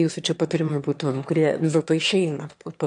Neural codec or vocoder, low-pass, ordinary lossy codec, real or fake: autoencoder, 22.05 kHz, a latent of 192 numbers a frame, VITS, trained on one speaker; 9.9 kHz; AAC, 64 kbps; fake